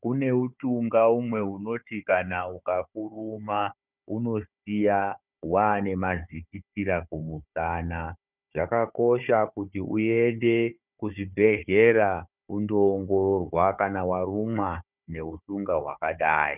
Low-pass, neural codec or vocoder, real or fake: 3.6 kHz; codec, 16 kHz, 4 kbps, FunCodec, trained on Chinese and English, 50 frames a second; fake